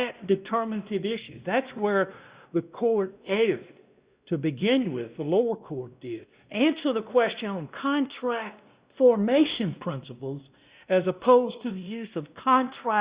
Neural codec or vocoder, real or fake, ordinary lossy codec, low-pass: codec, 16 kHz, 1 kbps, X-Codec, WavLM features, trained on Multilingual LibriSpeech; fake; Opus, 64 kbps; 3.6 kHz